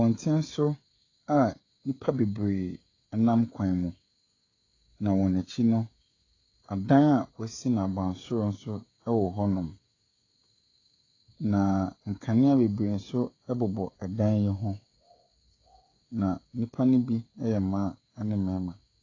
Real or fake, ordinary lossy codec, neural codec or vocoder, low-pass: fake; AAC, 32 kbps; codec, 16 kHz, 16 kbps, FreqCodec, smaller model; 7.2 kHz